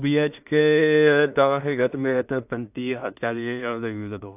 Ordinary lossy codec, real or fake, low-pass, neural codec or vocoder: AAC, 32 kbps; fake; 3.6 kHz; codec, 16 kHz in and 24 kHz out, 0.4 kbps, LongCat-Audio-Codec, two codebook decoder